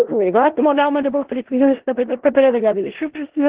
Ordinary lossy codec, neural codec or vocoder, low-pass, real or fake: Opus, 16 kbps; codec, 16 kHz in and 24 kHz out, 0.4 kbps, LongCat-Audio-Codec, four codebook decoder; 3.6 kHz; fake